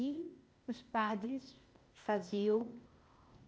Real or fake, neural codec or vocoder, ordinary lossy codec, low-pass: fake; codec, 16 kHz, 0.8 kbps, ZipCodec; none; none